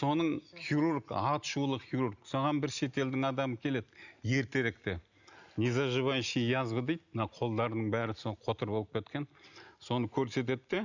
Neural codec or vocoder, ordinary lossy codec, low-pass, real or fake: none; none; 7.2 kHz; real